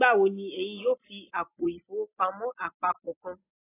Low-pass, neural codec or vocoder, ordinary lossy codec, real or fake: 3.6 kHz; none; AAC, 16 kbps; real